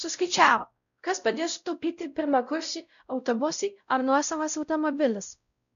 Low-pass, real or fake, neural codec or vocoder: 7.2 kHz; fake; codec, 16 kHz, 0.5 kbps, X-Codec, WavLM features, trained on Multilingual LibriSpeech